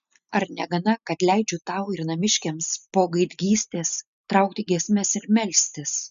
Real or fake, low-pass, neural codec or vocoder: real; 7.2 kHz; none